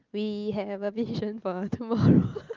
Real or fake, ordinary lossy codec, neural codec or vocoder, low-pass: real; Opus, 24 kbps; none; 7.2 kHz